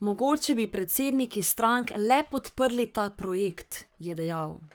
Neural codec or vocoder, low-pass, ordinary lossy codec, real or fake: codec, 44.1 kHz, 3.4 kbps, Pupu-Codec; none; none; fake